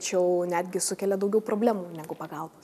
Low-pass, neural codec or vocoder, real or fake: 14.4 kHz; none; real